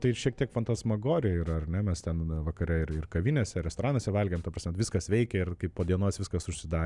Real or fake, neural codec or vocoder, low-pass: real; none; 10.8 kHz